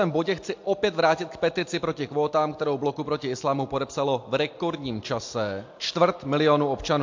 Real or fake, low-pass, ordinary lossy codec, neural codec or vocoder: real; 7.2 kHz; MP3, 48 kbps; none